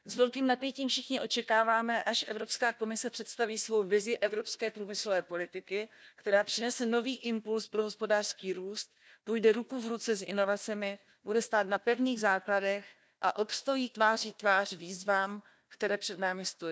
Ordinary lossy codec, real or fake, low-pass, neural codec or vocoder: none; fake; none; codec, 16 kHz, 1 kbps, FunCodec, trained on Chinese and English, 50 frames a second